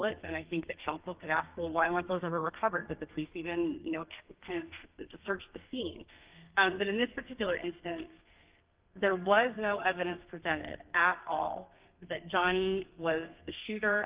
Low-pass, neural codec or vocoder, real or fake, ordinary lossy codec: 3.6 kHz; codec, 32 kHz, 1.9 kbps, SNAC; fake; Opus, 32 kbps